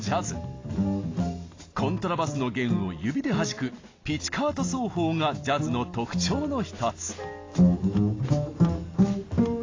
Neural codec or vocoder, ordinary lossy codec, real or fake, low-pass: none; AAC, 32 kbps; real; 7.2 kHz